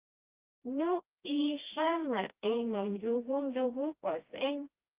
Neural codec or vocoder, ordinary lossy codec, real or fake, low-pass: codec, 16 kHz, 1 kbps, FreqCodec, smaller model; Opus, 32 kbps; fake; 3.6 kHz